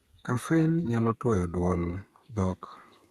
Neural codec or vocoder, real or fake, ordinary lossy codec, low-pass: codec, 32 kHz, 1.9 kbps, SNAC; fake; Opus, 64 kbps; 14.4 kHz